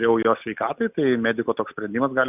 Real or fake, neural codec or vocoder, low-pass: real; none; 3.6 kHz